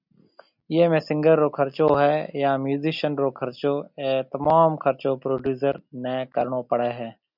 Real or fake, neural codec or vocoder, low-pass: real; none; 5.4 kHz